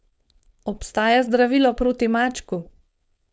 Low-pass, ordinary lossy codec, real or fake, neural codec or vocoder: none; none; fake; codec, 16 kHz, 4.8 kbps, FACodec